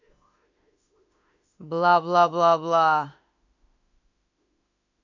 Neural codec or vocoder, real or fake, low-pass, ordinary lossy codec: autoencoder, 48 kHz, 32 numbers a frame, DAC-VAE, trained on Japanese speech; fake; 7.2 kHz; none